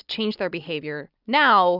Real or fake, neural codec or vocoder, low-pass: real; none; 5.4 kHz